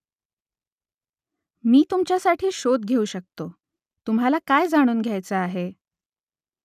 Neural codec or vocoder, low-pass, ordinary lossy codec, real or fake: none; 14.4 kHz; none; real